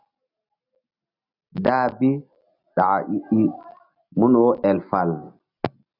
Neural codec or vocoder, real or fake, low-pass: none; real; 5.4 kHz